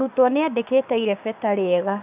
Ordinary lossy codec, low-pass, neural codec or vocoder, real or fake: none; 3.6 kHz; codec, 16 kHz, 6 kbps, DAC; fake